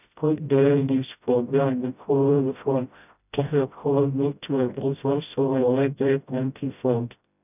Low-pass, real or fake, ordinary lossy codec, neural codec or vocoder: 3.6 kHz; fake; none; codec, 16 kHz, 0.5 kbps, FreqCodec, smaller model